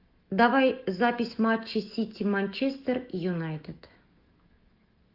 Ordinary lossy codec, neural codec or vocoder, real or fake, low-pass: Opus, 32 kbps; autoencoder, 48 kHz, 128 numbers a frame, DAC-VAE, trained on Japanese speech; fake; 5.4 kHz